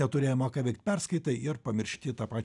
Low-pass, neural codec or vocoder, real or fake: 10.8 kHz; none; real